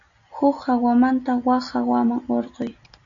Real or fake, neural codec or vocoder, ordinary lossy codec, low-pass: real; none; MP3, 96 kbps; 7.2 kHz